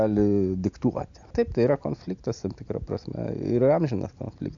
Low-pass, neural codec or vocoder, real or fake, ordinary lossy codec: 7.2 kHz; none; real; AAC, 64 kbps